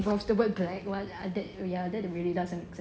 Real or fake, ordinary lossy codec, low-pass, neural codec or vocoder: real; none; none; none